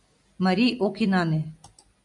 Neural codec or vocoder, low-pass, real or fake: none; 10.8 kHz; real